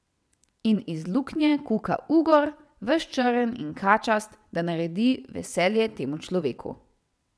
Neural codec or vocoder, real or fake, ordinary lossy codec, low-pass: vocoder, 22.05 kHz, 80 mel bands, WaveNeXt; fake; none; none